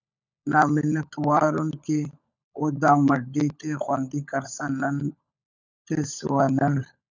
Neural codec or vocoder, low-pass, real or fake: codec, 16 kHz, 16 kbps, FunCodec, trained on LibriTTS, 50 frames a second; 7.2 kHz; fake